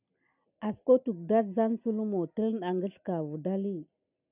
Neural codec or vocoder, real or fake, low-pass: none; real; 3.6 kHz